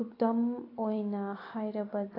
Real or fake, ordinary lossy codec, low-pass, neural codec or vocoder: fake; AAC, 24 kbps; 5.4 kHz; vocoder, 44.1 kHz, 128 mel bands every 256 samples, BigVGAN v2